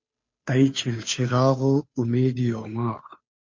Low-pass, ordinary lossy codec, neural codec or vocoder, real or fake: 7.2 kHz; MP3, 48 kbps; codec, 16 kHz, 2 kbps, FunCodec, trained on Chinese and English, 25 frames a second; fake